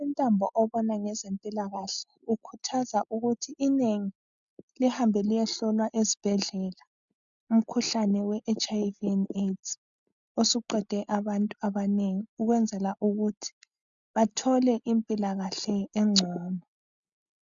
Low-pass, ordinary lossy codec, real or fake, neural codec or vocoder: 7.2 kHz; MP3, 96 kbps; real; none